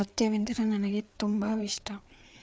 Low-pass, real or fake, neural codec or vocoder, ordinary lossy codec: none; fake; codec, 16 kHz, 4 kbps, FreqCodec, larger model; none